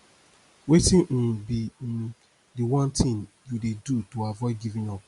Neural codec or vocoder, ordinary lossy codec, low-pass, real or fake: none; Opus, 64 kbps; 10.8 kHz; real